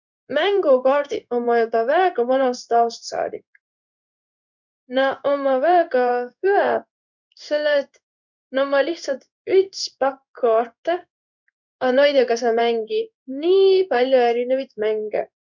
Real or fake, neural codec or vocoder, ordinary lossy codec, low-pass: fake; codec, 16 kHz in and 24 kHz out, 1 kbps, XY-Tokenizer; none; 7.2 kHz